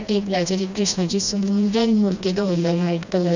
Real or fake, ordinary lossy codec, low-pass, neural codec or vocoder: fake; none; 7.2 kHz; codec, 16 kHz, 1 kbps, FreqCodec, smaller model